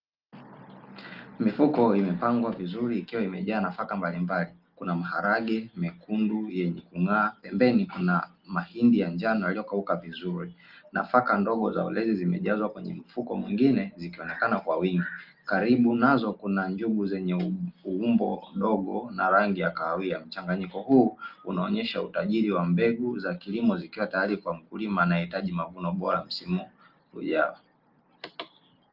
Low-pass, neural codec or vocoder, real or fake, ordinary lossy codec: 5.4 kHz; none; real; Opus, 24 kbps